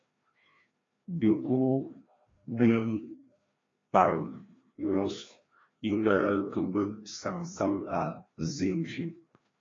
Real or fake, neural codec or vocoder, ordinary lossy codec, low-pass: fake; codec, 16 kHz, 1 kbps, FreqCodec, larger model; AAC, 32 kbps; 7.2 kHz